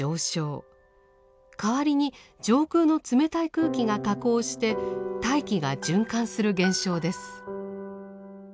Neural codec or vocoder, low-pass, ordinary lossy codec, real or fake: none; none; none; real